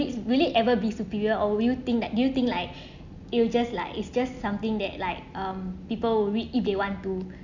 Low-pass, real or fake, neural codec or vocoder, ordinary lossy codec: 7.2 kHz; real; none; none